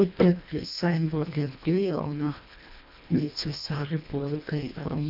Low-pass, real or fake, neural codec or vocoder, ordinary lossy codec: 5.4 kHz; fake; codec, 24 kHz, 1.5 kbps, HILCodec; none